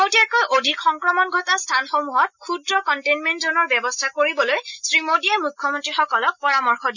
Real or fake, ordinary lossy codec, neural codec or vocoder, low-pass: real; none; none; 7.2 kHz